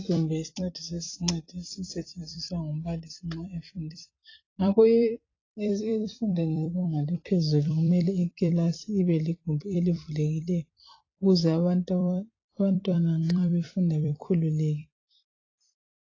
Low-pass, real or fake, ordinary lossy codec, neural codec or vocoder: 7.2 kHz; fake; AAC, 32 kbps; vocoder, 44.1 kHz, 128 mel bands every 256 samples, BigVGAN v2